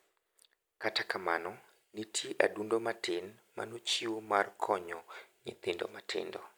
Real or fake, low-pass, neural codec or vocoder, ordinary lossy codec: real; none; none; none